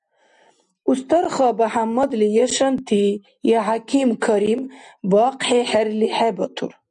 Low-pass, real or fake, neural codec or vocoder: 10.8 kHz; real; none